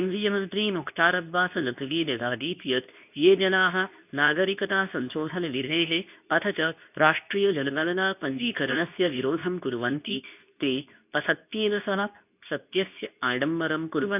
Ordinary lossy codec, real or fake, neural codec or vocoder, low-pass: none; fake; codec, 24 kHz, 0.9 kbps, WavTokenizer, medium speech release version 2; 3.6 kHz